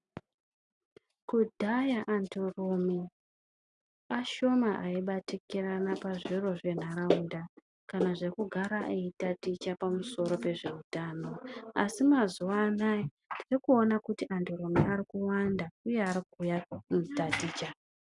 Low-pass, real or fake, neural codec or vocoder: 10.8 kHz; real; none